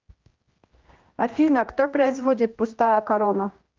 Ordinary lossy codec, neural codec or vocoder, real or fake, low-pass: Opus, 32 kbps; codec, 16 kHz, 1 kbps, X-Codec, HuBERT features, trained on general audio; fake; 7.2 kHz